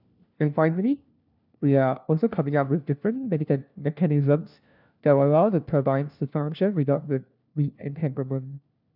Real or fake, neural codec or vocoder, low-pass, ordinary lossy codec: fake; codec, 16 kHz, 1 kbps, FunCodec, trained on LibriTTS, 50 frames a second; 5.4 kHz; none